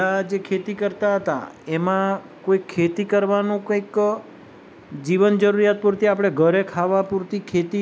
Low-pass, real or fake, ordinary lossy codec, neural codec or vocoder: none; real; none; none